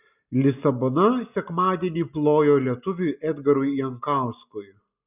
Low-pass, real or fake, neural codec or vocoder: 3.6 kHz; real; none